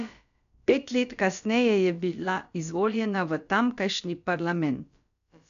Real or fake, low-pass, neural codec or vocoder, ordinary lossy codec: fake; 7.2 kHz; codec, 16 kHz, about 1 kbps, DyCAST, with the encoder's durations; none